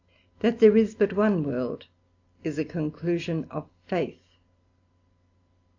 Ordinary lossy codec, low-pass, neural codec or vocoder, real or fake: AAC, 48 kbps; 7.2 kHz; none; real